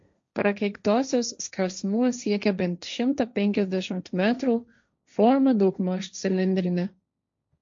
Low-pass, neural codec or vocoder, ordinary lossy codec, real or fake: 7.2 kHz; codec, 16 kHz, 1.1 kbps, Voila-Tokenizer; MP3, 48 kbps; fake